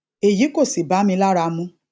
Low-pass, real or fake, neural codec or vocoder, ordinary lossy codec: none; real; none; none